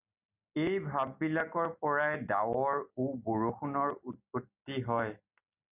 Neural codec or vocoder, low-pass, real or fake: none; 3.6 kHz; real